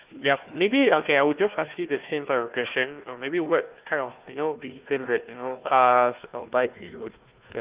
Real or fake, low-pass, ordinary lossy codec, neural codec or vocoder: fake; 3.6 kHz; Opus, 32 kbps; codec, 16 kHz, 1 kbps, FunCodec, trained on Chinese and English, 50 frames a second